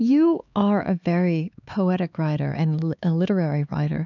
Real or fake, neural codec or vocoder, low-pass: fake; codec, 16 kHz, 4 kbps, X-Codec, HuBERT features, trained on LibriSpeech; 7.2 kHz